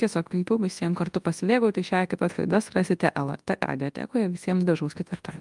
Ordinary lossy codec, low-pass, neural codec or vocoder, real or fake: Opus, 32 kbps; 10.8 kHz; codec, 24 kHz, 0.9 kbps, WavTokenizer, large speech release; fake